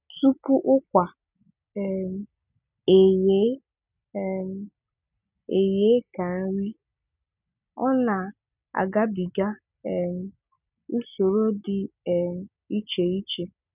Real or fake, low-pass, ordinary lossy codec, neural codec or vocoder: real; 3.6 kHz; none; none